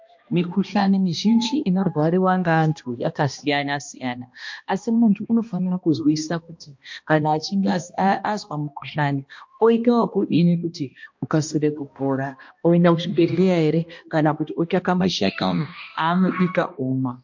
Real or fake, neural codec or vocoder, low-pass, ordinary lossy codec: fake; codec, 16 kHz, 1 kbps, X-Codec, HuBERT features, trained on balanced general audio; 7.2 kHz; MP3, 48 kbps